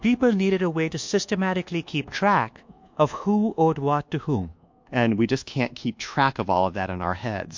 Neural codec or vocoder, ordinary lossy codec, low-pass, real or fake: codec, 24 kHz, 1.2 kbps, DualCodec; MP3, 48 kbps; 7.2 kHz; fake